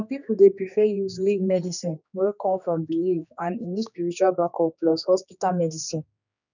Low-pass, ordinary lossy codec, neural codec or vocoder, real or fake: 7.2 kHz; none; codec, 16 kHz, 2 kbps, X-Codec, HuBERT features, trained on general audio; fake